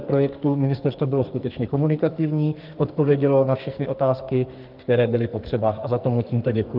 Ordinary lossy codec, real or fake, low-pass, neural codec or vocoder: Opus, 32 kbps; fake; 5.4 kHz; codec, 44.1 kHz, 2.6 kbps, SNAC